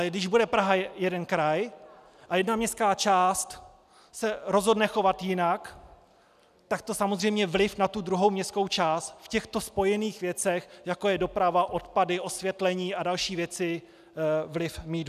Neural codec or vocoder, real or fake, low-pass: none; real; 14.4 kHz